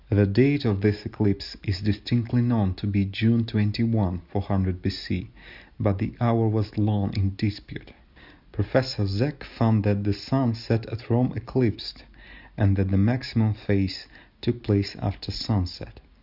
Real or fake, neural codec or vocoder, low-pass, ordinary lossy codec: real; none; 5.4 kHz; Opus, 64 kbps